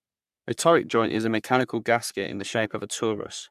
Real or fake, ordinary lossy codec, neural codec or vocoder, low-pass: fake; none; codec, 44.1 kHz, 3.4 kbps, Pupu-Codec; 14.4 kHz